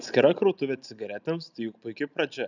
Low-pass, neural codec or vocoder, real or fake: 7.2 kHz; none; real